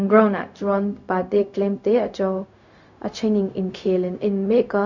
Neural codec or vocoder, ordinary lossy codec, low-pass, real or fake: codec, 16 kHz, 0.4 kbps, LongCat-Audio-Codec; none; 7.2 kHz; fake